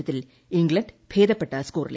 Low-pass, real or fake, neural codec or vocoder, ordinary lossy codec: none; real; none; none